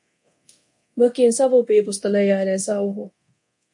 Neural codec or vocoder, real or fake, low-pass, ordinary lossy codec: codec, 24 kHz, 0.9 kbps, DualCodec; fake; 10.8 kHz; MP3, 48 kbps